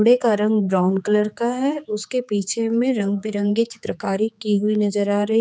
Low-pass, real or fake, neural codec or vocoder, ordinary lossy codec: none; fake; codec, 16 kHz, 4 kbps, X-Codec, HuBERT features, trained on general audio; none